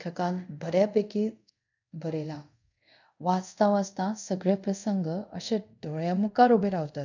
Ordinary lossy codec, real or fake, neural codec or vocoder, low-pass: none; fake; codec, 24 kHz, 0.5 kbps, DualCodec; 7.2 kHz